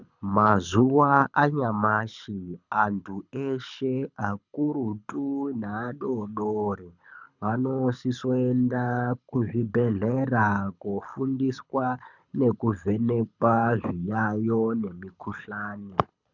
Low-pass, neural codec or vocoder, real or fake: 7.2 kHz; codec, 24 kHz, 6 kbps, HILCodec; fake